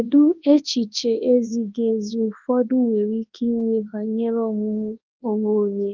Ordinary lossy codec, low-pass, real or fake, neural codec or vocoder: Opus, 32 kbps; 7.2 kHz; fake; codec, 24 kHz, 0.9 kbps, WavTokenizer, large speech release